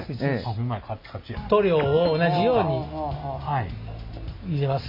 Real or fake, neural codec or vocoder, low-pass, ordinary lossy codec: real; none; 5.4 kHz; MP3, 32 kbps